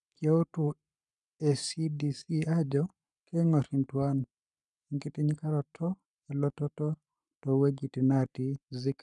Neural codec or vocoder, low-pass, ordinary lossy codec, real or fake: codec, 44.1 kHz, 7.8 kbps, Pupu-Codec; 10.8 kHz; none; fake